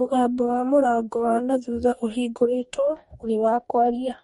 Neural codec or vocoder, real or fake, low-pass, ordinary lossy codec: codec, 44.1 kHz, 2.6 kbps, DAC; fake; 19.8 kHz; MP3, 48 kbps